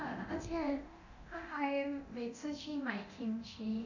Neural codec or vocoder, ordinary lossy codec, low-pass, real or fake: codec, 24 kHz, 0.9 kbps, DualCodec; none; 7.2 kHz; fake